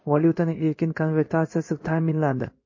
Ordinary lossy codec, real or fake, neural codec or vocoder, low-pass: MP3, 32 kbps; fake; codec, 16 kHz in and 24 kHz out, 1 kbps, XY-Tokenizer; 7.2 kHz